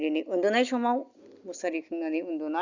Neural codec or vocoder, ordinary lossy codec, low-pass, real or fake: none; Opus, 64 kbps; 7.2 kHz; real